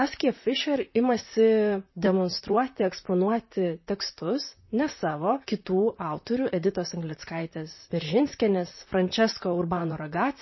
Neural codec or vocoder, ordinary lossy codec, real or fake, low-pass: vocoder, 44.1 kHz, 128 mel bands, Pupu-Vocoder; MP3, 24 kbps; fake; 7.2 kHz